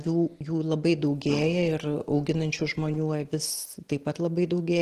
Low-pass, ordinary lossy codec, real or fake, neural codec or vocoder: 14.4 kHz; Opus, 16 kbps; real; none